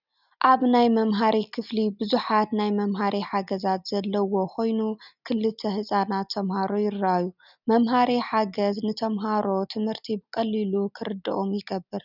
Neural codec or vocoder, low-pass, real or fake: none; 5.4 kHz; real